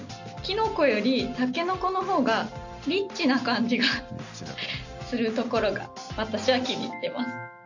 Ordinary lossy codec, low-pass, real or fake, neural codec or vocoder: none; 7.2 kHz; real; none